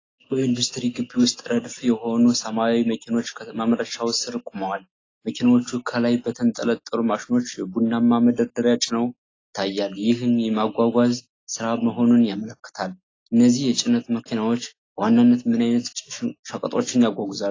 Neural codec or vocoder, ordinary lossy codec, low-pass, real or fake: none; AAC, 32 kbps; 7.2 kHz; real